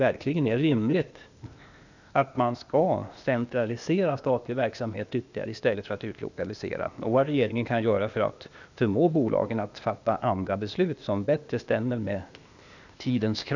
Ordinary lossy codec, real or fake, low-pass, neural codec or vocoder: none; fake; 7.2 kHz; codec, 16 kHz, 0.8 kbps, ZipCodec